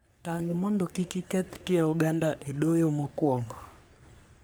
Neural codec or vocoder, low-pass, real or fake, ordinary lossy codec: codec, 44.1 kHz, 3.4 kbps, Pupu-Codec; none; fake; none